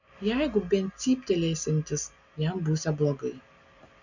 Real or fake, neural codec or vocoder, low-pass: real; none; 7.2 kHz